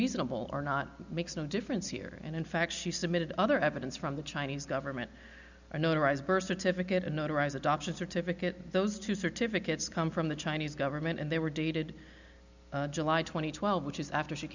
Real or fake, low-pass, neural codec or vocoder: real; 7.2 kHz; none